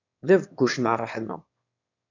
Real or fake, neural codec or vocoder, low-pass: fake; autoencoder, 22.05 kHz, a latent of 192 numbers a frame, VITS, trained on one speaker; 7.2 kHz